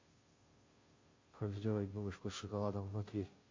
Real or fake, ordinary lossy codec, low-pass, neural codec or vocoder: fake; MP3, 32 kbps; 7.2 kHz; codec, 16 kHz, 0.5 kbps, FunCodec, trained on Chinese and English, 25 frames a second